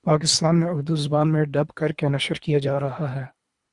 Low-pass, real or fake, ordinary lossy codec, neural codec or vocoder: 10.8 kHz; fake; Opus, 64 kbps; codec, 24 kHz, 3 kbps, HILCodec